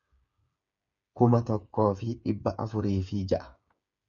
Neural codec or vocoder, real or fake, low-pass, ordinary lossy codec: codec, 16 kHz, 8 kbps, FreqCodec, smaller model; fake; 7.2 kHz; MP3, 48 kbps